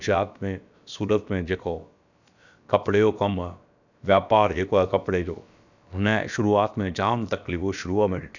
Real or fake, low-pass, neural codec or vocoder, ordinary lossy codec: fake; 7.2 kHz; codec, 16 kHz, about 1 kbps, DyCAST, with the encoder's durations; none